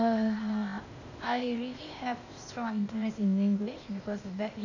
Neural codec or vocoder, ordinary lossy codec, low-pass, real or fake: codec, 16 kHz, 0.8 kbps, ZipCodec; none; 7.2 kHz; fake